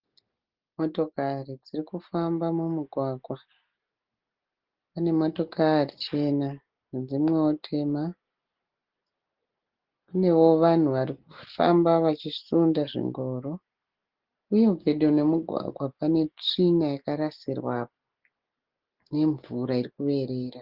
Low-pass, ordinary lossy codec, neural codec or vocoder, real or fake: 5.4 kHz; Opus, 16 kbps; none; real